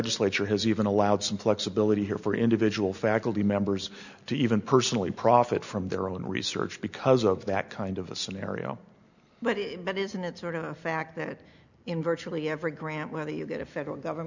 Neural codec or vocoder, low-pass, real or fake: none; 7.2 kHz; real